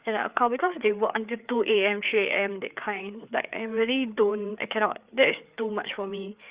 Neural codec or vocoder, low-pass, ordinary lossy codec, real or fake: codec, 16 kHz, 4 kbps, FreqCodec, larger model; 3.6 kHz; Opus, 64 kbps; fake